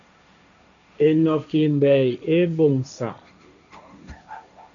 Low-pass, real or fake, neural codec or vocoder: 7.2 kHz; fake; codec, 16 kHz, 1.1 kbps, Voila-Tokenizer